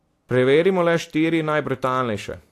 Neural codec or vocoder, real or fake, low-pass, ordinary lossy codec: none; real; 14.4 kHz; AAC, 64 kbps